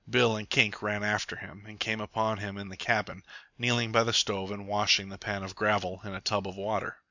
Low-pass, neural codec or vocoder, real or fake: 7.2 kHz; none; real